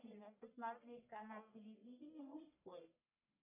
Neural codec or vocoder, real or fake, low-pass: codec, 44.1 kHz, 1.7 kbps, Pupu-Codec; fake; 3.6 kHz